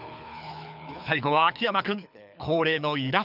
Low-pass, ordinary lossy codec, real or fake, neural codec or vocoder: 5.4 kHz; none; fake; codec, 24 kHz, 6 kbps, HILCodec